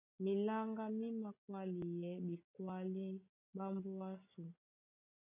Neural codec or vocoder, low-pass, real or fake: none; 3.6 kHz; real